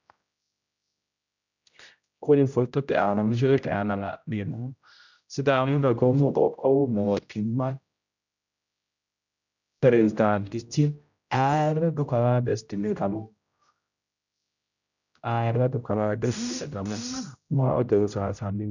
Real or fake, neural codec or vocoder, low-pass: fake; codec, 16 kHz, 0.5 kbps, X-Codec, HuBERT features, trained on general audio; 7.2 kHz